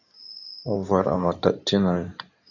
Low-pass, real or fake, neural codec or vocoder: 7.2 kHz; fake; codec, 16 kHz in and 24 kHz out, 2.2 kbps, FireRedTTS-2 codec